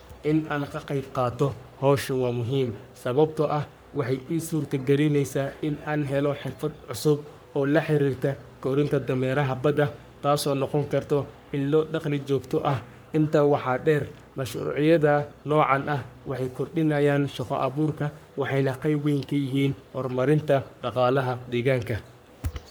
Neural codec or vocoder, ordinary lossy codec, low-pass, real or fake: codec, 44.1 kHz, 3.4 kbps, Pupu-Codec; none; none; fake